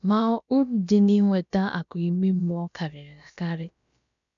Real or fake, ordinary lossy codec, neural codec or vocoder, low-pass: fake; MP3, 96 kbps; codec, 16 kHz, about 1 kbps, DyCAST, with the encoder's durations; 7.2 kHz